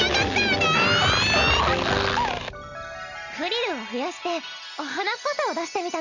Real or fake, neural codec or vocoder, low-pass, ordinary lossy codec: real; none; 7.2 kHz; none